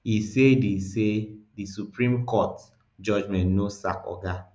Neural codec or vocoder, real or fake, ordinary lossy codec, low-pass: none; real; none; none